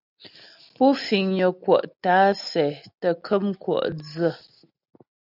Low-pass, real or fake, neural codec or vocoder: 5.4 kHz; real; none